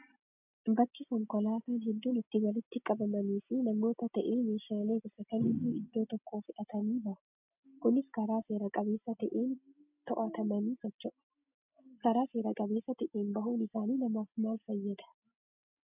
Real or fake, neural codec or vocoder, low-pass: real; none; 3.6 kHz